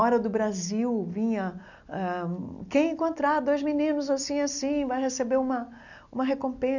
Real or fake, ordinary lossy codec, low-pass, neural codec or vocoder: real; none; 7.2 kHz; none